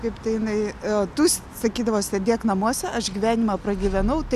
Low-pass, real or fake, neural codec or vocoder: 14.4 kHz; real; none